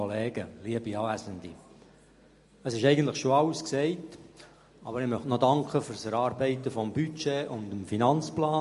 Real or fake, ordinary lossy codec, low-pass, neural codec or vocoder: real; MP3, 48 kbps; 14.4 kHz; none